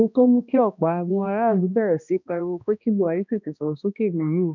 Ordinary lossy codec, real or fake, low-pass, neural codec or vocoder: none; fake; 7.2 kHz; codec, 16 kHz, 1 kbps, X-Codec, HuBERT features, trained on balanced general audio